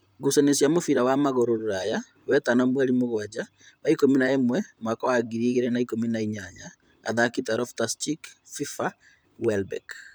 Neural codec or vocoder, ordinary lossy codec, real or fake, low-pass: vocoder, 44.1 kHz, 128 mel bands, Pupu-Vocoder; none; fake; none